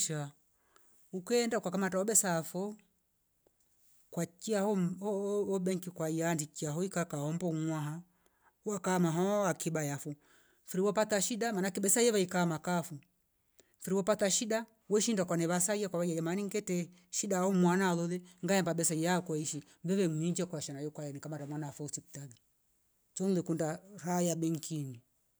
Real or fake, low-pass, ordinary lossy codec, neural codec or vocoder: real; none; none; none